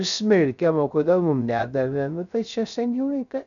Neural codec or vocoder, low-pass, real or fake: codec, 16 kHz, 0.3 kbps, FocalCodec; 7.2 kHz; fake